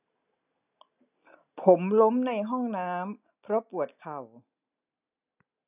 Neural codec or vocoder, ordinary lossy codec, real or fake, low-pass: none; none; real; 3.6 kHz